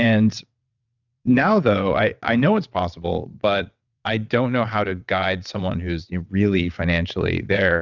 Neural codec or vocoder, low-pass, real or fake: vocoder, 22.05 kHz, 80 mel bands, WaveNeXt; 7.2 kHz; fake